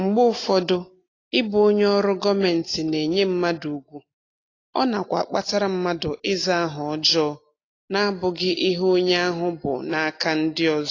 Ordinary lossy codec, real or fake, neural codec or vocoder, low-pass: AAC, 32 kbps; real; none; 7.2 kHz